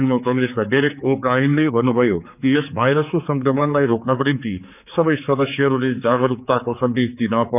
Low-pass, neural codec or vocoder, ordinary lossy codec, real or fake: 3.6 kHz; codec, 16 kHz, 4 kbps, X-Codec, HuBERT features, trained on general audio; none; fake